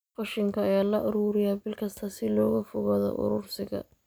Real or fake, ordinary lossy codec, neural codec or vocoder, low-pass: real; none; none; none